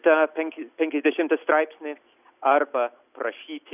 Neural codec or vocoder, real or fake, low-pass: none; real; 3.6 kHz